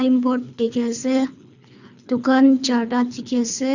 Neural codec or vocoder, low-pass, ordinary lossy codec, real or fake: codec, 24 kHz, 3 kbps, HILCodec; 7.2 kHz; none; fake